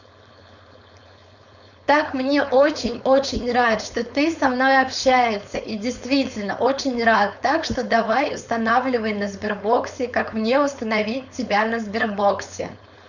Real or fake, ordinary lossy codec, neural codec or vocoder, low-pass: fake; none; codec, 16 kHz, 4.8 kbps, FACodec; 7.2 kHz